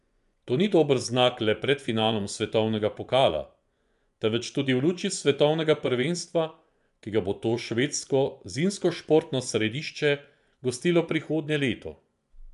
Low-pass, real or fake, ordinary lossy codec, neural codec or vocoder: 10.8 kHz; fake; none; vocoder, 24 kHz, 100 mel bands, Vocos